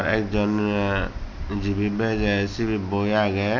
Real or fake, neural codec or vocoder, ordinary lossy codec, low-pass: real; none; none; 7.2 kHz